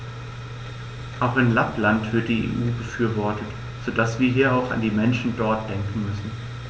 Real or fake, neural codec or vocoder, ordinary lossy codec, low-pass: real; none; none; none